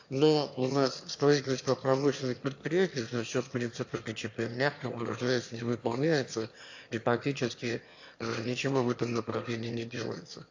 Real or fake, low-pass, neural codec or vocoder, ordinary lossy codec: fake; 7.2 kHz; autoencoder, 22.05 kHz, a latent of 192 numbers a frame, VITS, trained on one speaker; AAC, 48 kbps